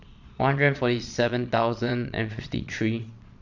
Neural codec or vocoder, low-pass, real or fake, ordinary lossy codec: vocoder, 22.05 kHz, 80 mel bands, Vocos; 7.2 kHz; fake; none